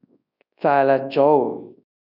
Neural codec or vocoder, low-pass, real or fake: codec, 24 kHz, 0.9 kbps, WavTokenizer, large speech release; 5.4 kHz; fake